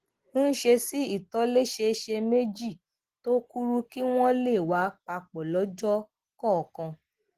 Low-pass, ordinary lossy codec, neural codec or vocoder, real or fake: 14.4 kHz; Opus, 16 kbps; none; real